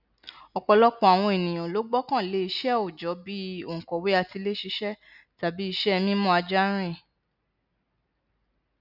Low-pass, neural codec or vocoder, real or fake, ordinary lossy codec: 5.4 kHz; none; real; none